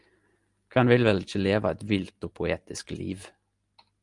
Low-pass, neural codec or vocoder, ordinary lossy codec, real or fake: 10.8 kHz; none; Opus, 32 kbps; real